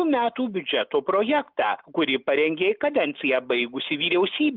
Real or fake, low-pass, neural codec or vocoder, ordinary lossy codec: real; 5.4 kHz; none; Opus, 32 kbps